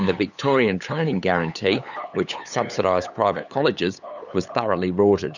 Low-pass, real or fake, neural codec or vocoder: 7.2 kHz; fake; codec, 16 kHz, 8 kbps, FunCodec, trained on LibriTTS, 25 frames a second